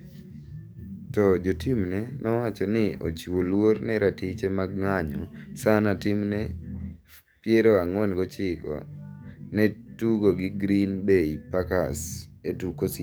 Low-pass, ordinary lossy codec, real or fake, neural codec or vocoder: none; none; fake; codec, 44.1 kHz, 7.8 kbps, DAC